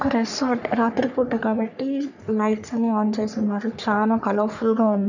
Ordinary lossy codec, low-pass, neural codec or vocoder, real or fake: none; 7.2 kHz; codec, 44.1 kHz, 3.4 kbps, Pupu-Codec; fake